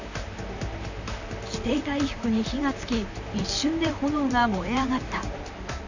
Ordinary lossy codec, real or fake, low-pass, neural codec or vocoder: none; fake; 7.2 kHz; vocoder, 44.1 kHz, 128 mel bands, Pupu-Vocoder